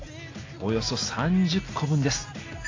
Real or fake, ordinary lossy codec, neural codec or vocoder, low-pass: real; AAC, 32 kbps; none; 7.2 kHz